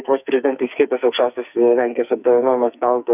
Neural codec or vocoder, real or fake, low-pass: codec, 44.1 kHz, 2.6 kbps, SNAC; fake; 3.6 kHz